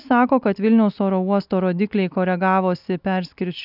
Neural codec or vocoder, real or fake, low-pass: none; real; 5.4 kHz